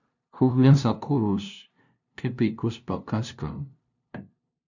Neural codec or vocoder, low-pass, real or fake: codec, 16 kHz, 0.5 kbps, FunCodec, trained on LibriTTS, 25 frames a second; 7.2 kHz; fake